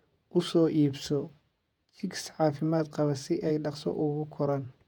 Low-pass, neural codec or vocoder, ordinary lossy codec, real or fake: 19.8 kHz; vocoder, 48 kHz, 128 mel bands, Vocos; none; fake